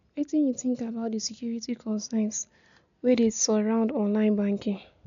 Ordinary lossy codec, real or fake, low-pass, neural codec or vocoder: none; real; 7.2 kHz; none